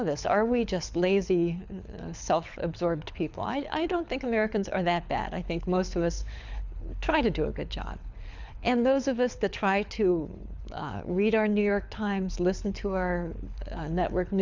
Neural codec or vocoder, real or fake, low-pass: codec, 24 kHz, 6 kbps, HILCodec; fake; 7.2 kHz